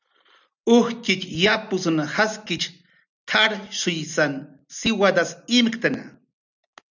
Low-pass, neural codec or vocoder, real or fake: 7.2 kHz; none; real